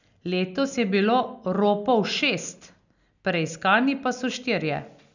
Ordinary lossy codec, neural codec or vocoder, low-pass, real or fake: none; none; 7.2 kHz; real